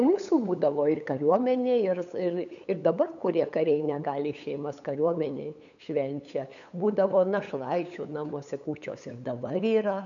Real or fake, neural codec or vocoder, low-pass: fake; codec, 16 kHz, 8 kbps, FunCodec, trained on LibriTTS, 25 frames a second; 7.2 kHz